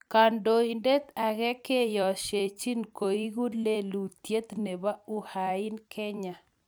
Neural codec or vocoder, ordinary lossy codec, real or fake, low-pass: vocoder, 44.1 kHz, 128 mel bands every 256 samples, BigVGAN v2; none; fake; none